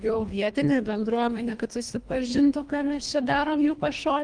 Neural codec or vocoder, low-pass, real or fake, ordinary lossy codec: codec, 24 kHz, 1.5 kbps, HILCodec; 9.9 kHz; fake; Opus, 32 kbps